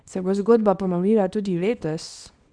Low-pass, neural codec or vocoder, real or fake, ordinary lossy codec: 9.9 kHz; codec, 24 kHz, 0.9 kbps, WavTokenizer, small release; fake; Opus, 64 kbps